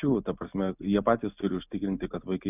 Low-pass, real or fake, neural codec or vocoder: 3.6 kHz; real; none